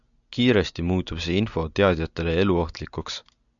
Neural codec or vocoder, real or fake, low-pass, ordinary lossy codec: none; real; 7.2 kHz; MP3, 96 kbps